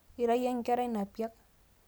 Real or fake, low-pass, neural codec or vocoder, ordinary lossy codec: real; none; none; none